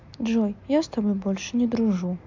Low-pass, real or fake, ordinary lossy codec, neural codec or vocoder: 7.2 kHz; real; MP3, 64 kbps; none